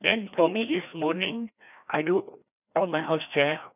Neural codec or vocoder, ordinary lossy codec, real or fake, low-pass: codec, 16 kHz, 1 kbps, FreqCodec, larger model; none; fake; 3.6 kHz